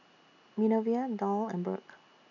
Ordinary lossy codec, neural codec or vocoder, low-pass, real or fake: none; none; 7.2 kHz; real